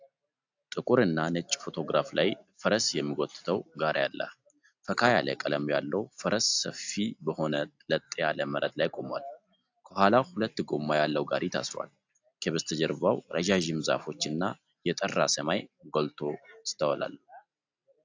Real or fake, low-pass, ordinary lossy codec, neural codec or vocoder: real; 7.2 kHz; AAC, 48 kbps; none